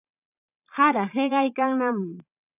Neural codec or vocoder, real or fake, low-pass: vocoder, 22.05 kHz, 80 mel bands, Vocos; fake; 3.6 kHz